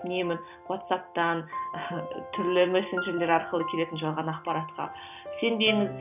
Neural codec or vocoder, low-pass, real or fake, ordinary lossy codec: none; 3.6 kHz; real; none